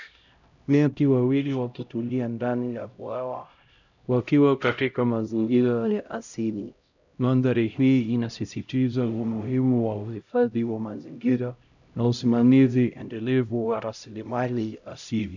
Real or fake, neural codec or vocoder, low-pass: fake; codec, 16 kHz, 0.5 kbps, X-Codec, HuBERT features, trained on LibriSpeech; 7.2 kHz